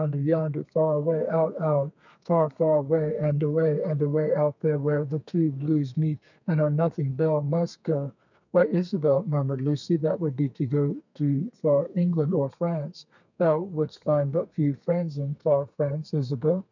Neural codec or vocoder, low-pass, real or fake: codec, 32 kHz, 1.9 kbps, SNAC; 7.2 kHz; fake